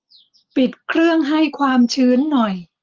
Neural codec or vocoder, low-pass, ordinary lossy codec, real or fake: none; 7.2 kHz; Opus, 24 kbps; real